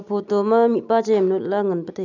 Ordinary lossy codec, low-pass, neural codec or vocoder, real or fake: none; 7.2 kHz; none; real